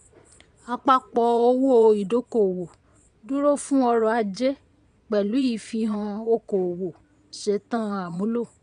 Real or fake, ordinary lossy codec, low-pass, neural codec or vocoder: fake; none; 9.9 kHz; vocoder, 22.05 kHz, 80 mel bands, Vocos